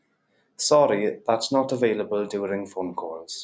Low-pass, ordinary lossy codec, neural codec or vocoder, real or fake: none; none; none; real